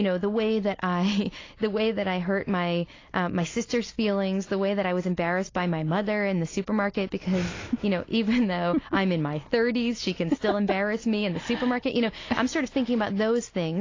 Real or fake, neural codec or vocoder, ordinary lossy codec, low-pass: real; none; AAC, 32 kbps; 7.2 kHz